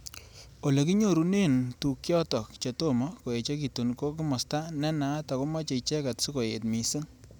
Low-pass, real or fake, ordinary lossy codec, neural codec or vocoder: none; real; none; none